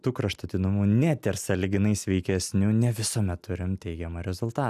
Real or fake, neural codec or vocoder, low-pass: real; none; 14.4 kHz